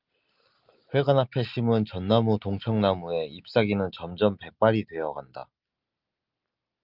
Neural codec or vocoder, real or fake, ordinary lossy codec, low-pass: none; real; Opus, 24 kbps; 5.4 kHz